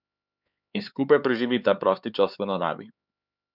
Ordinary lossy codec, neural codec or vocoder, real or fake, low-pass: none; codec, 16 kHz, 4 kbps, X-Codec, HuBERT features, trained on LibriSpeech; fake; 5.4 kHz